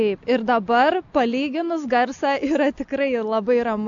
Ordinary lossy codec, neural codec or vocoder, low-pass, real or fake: AAC, 64 kbps; none; 7.2 kHz; real